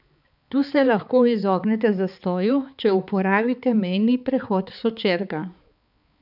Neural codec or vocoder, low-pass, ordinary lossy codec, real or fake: codec, 16 kHz, 4 kbps, X-Codec, HuBERT features, trained on balanced general audio; 5.4 kHz; none; fake